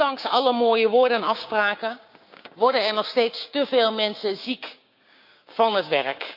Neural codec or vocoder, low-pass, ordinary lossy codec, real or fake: codec, 16 kHz, 6 kbps, DAC; 5.4 kHz; none; fake